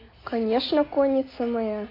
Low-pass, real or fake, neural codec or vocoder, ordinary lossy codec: 5.4 kHz; real; none; AAC, 24 kbps